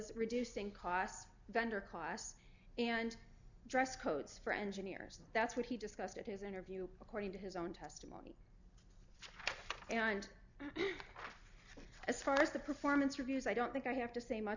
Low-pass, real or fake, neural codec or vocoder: 7.2 kHz; real; none